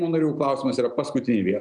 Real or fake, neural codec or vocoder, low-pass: real; none; 9.9 kHz